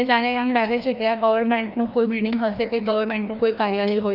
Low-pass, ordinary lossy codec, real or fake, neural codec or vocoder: 5.4 kHz; none; fake; codec, 16 kHz, 1 kbps, FreqCodec, larger model